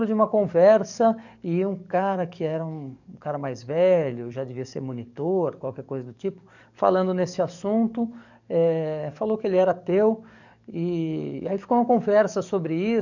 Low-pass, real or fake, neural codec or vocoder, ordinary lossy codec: 7.2 kHz; fake; codec, 44.1 kHz, 7.8 kbps, DAC; none